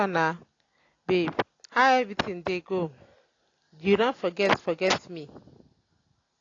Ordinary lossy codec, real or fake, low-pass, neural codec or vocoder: AAC, 32 kbps; real; 7.2 kHz; none